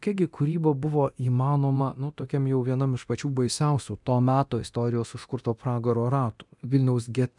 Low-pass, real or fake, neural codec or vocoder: 10.8 kHz; fake; codec, 24 kHz, 0.9 kbps, DualCodec